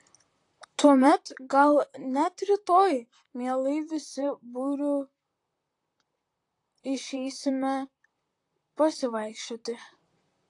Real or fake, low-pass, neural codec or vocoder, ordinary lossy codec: fake; 10.8 kHz; vocoder, 44.1 kHz, 128 mel bands, Pupu-Vocoder; AAC, 48 kbps